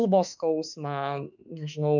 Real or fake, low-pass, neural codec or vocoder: fake; 7.2 kHz; autoencoder, 48 kHz, 32 numbers a frame, DAC-VAE, trained on Japanese speech